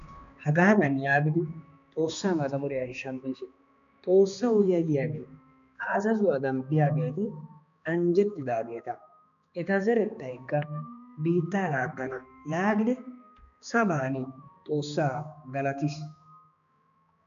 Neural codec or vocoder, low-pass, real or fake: codec, 16 kHz, 2 kbps, X-Codec, HuBERT features, trained on balanced general audio; 7.2 kHz; fake